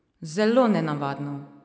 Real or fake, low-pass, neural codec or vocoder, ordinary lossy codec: real; none; none; none